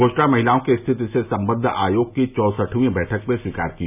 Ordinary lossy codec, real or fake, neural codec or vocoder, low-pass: none; real; none; 3.6 kHz